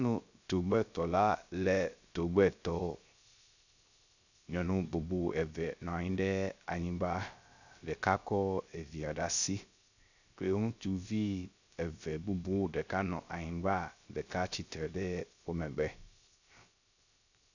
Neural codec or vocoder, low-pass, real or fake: codec, 16 kHz, 0.3 kbps, FocalCodec; 7.2 kHz; fake